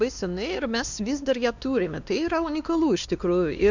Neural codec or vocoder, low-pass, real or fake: codec, 16 kHz, 2 kbps, X-Codec, WavLM features, trained on Multilingual LibriSpeech; 7.2 kHz; fake